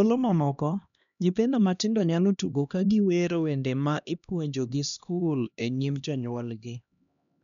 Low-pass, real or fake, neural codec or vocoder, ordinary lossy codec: 7.2 kHz; fake; codec, 16 kHz, 1 kbps, X-Codec, HuBERT features, trained on LibriSpeech; none